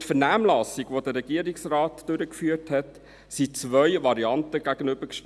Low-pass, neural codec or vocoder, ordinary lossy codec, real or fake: none; none; none; real